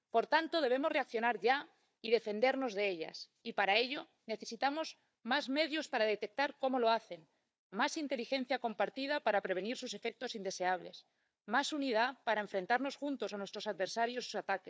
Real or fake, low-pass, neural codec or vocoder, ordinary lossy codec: fake; none; codec, 16 kHz, 4 kbps, FunCodec, trained on Chinese and English, 50 frames a second; none